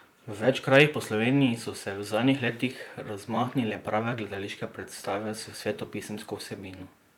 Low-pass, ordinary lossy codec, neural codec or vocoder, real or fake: 19.8 kHz; none; vocoder, 44.1 kHz, 128 mel bands, Pupu-Vocoder; fake